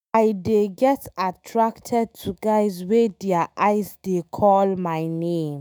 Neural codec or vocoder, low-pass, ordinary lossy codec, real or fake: autoencoder, 48 kHz, 128 numbers a frame, DAC-VAE, trained on Japanese speech; none; none; fake